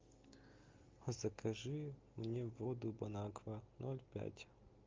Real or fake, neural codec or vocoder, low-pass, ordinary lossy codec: real; none; 7.2 kHz; Opus, 24 kbps